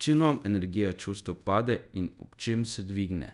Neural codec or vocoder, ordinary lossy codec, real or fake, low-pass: codec, 24 kHz, 0.5 kbps, DualCodec; none; fake; 10.8 kHz